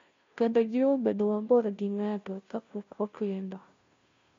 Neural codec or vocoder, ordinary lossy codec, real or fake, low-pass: codec, 16 kHz, 0.5 kbps, FunCodec, trained on Chinese and English, 25 frames a second; MP3, 48 kbps; fake; 7.2 kHz